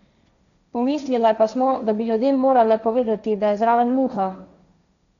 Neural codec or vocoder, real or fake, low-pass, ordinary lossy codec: codec, 16 kHz, 1.1 kbps, Voila-Tokenizer; fake; 7.2 kHz; none